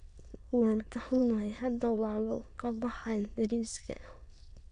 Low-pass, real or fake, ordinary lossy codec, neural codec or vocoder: 9.9 kHz; fake; none; autoencoder, 22.05 kHz, a latent of 192 numbers a frame, VITS, trained on many speakers